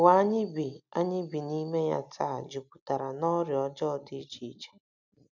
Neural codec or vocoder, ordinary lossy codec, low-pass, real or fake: none; none; 7.2 kHz; real